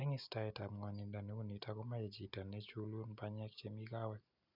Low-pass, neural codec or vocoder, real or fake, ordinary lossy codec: 5.4 kHz; none; real; none